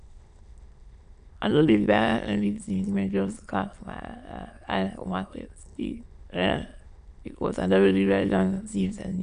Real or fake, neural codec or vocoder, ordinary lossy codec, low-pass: fake; autoencoder, 22.05 kHz, a latent of 192 numbers a frame, VITS, trained on many speakers; none; 9.9 kHz